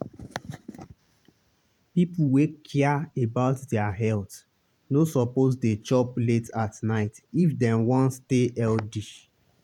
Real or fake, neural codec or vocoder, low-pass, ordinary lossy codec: fake; vocoder, 44.1 kHz, 128 mel bands every 512 samples, BigVGAN v2; 19.8 kHz; none